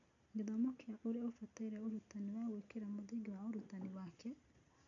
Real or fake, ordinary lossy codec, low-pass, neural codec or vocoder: real; none; 7.2 kHz; none